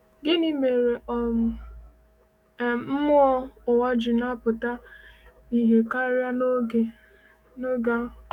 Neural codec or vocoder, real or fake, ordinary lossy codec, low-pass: autoencoder, 48 kHz, 128 numbers a frame, DAC-VAE, trained on Japanese speech; fake; none; 19.8 kHz